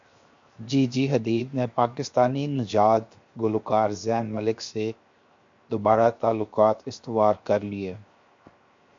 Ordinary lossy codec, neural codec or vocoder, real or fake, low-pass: MP3, 48 kbps; codec, 16 kHz, 0.7 kbps, FocalCodec; fake; 7.2 kHz